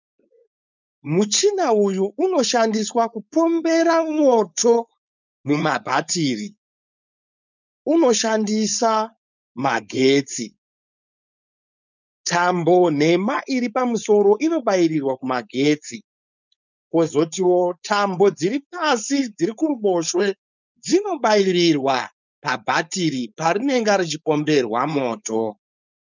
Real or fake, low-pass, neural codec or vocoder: fake; 7.2 kHz; codec, 16 kHz, 4.8 kbps, FACodec